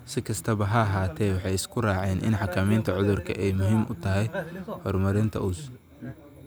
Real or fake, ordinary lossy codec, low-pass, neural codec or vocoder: real; none; none; none